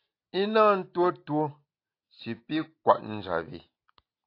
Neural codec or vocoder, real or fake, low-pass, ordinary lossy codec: none; real; 5.4 kHz; AAC, 32 kbps